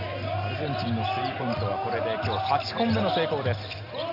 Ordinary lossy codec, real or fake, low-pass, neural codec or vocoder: none; real; 5.4 kHz; none